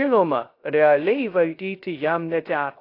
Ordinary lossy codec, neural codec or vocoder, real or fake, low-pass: AAC, 32 kbps; codec, 16 kHz, 0.3 kbps, FocalCodec; fake; 5.4 kHz